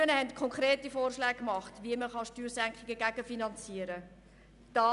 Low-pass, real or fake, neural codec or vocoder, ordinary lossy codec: 10.8 kHz; real; none; none